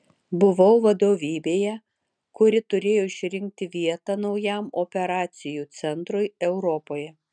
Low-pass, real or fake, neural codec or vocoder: 9.9 kHz; real; none